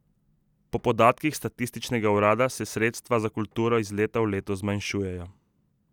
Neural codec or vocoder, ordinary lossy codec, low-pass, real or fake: none; none; 19.8 kHz; real